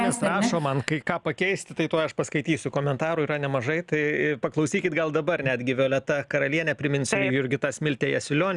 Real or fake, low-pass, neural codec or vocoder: real; 10.8 kHz; none